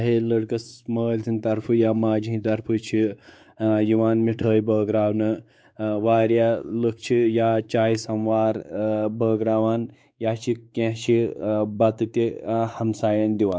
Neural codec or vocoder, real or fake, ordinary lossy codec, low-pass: codec, 16 kHz, 4 kbps, X-Codec, WavLM features, trained on Multilingual LibriSpeech; fake; none; none